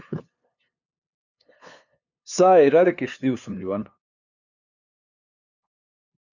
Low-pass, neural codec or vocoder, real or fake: 7.2 kHz; codec, 16 kHz, 2 kbps, FunCodec, trained on LibriTTS, 25 frames a second; fake